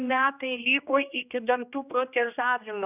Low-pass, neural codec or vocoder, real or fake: 3.6 kHz; codec, 16 kHz, 1 kbps, X-Codec, HuBERT features, trained on general audio; fake